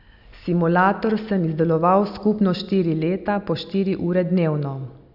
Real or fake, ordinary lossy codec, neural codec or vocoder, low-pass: real; none; none; 5.4 kHz